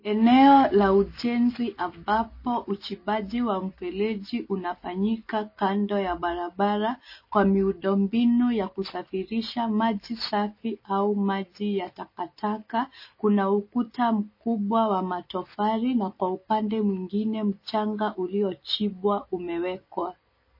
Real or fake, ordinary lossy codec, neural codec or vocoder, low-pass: real; MP3, 24 kbps; none; 5.4 kHz